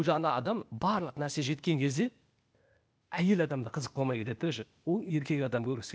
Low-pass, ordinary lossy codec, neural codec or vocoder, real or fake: none; none; codec, 16 kHz, 0.8 kbps, ZipCodec; fake